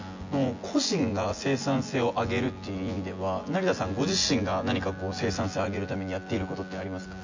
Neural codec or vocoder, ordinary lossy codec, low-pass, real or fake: vocoder, 24 kHz, 100 mel bands, Vocos; MP3, 64 kbps; 7.2 kHz; fake